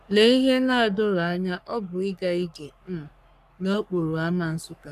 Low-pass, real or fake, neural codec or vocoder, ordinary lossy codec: 14.4 kHz; fake; codec, 44.1 kHz, 3.4 kbps, Pupu-Codec; none